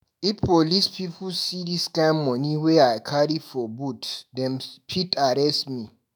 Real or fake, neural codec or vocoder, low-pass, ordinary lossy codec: fake; autoencoder, 48 kHz, 128 numbers a frame, DAC-VAE, trained on Japanese speech; none; none